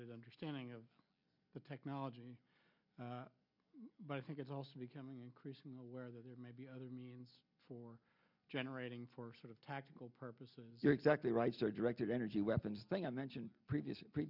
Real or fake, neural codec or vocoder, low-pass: real; none; 5.4 kHz